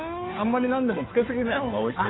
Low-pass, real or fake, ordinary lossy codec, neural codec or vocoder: 7.2 kHz; fake; AAC, 16 kbps; codec, 16 kHz, 2 kbps, FunCodec, trained on Chinese and English, 25 frames a second